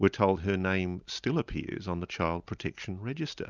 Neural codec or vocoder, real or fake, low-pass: none; real; 7.2 kHz